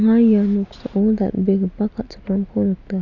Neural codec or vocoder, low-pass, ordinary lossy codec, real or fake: none; 7.2 kHz; none; real